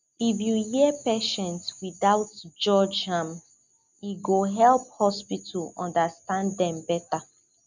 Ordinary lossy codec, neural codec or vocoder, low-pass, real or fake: none; none; 7.2 kHz; real